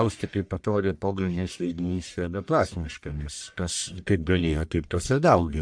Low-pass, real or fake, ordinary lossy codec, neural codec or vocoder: 9.9 kHz; fake; AAC, 64 kbps; codec, 44.1 kHz, 1.7 kbps, Pupu-Codec